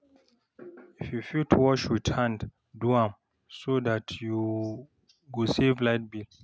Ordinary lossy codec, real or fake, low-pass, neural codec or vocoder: none; real; none; none